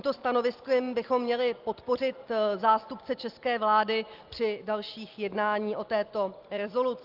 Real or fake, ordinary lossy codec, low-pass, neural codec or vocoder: real; Opus, 32 kbps; 5.4 kHz; none